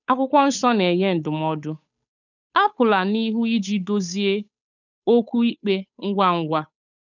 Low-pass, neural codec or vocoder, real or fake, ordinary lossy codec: 7.2 kHz; codec, 16 kHz, 8 kbps, FunCodec, trained on Chinese and English, 25 frames a second; fake; none